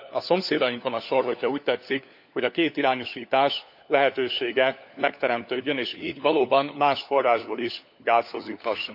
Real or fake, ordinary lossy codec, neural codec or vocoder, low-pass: fake; none; codec, 16 kHz, 4 kbps, FunCodec, trained on LibriTTS, 50 frames a second; 5.4 kHz